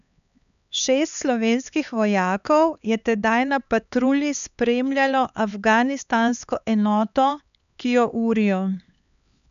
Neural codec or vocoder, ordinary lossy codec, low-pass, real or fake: codec, 16 kHz, 4 kbps, X-Codec, HuBERT features, trained on LibriSpeech; none; 7.2 kHz; fake